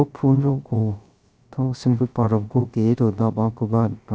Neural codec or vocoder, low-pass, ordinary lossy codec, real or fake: codec, 16 kHz, 0.3 kbps, FocalCodec; none; none; fake